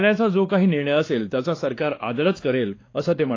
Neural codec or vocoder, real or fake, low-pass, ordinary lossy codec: codec, 16 kHz, 2 kbps, X-Codec, WavLM features, trained on Multilingual LibriSpeech; fake; 7.2 kHz; AAC, 32 kbps